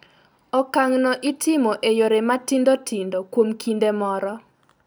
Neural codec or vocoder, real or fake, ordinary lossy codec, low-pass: none; real; none; none